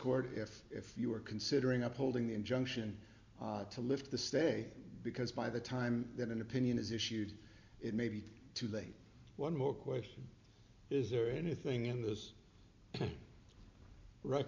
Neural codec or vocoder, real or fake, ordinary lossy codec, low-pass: none; real; MP3, 64 kbps; 7.2 kHz